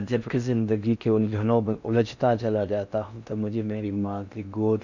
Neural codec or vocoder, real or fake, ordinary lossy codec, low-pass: codec, 16 kHz in and 24 kHz out, 0.6 kbps, FocalCodec, streaming, 4096 codes; fake; none; 7.2 kHz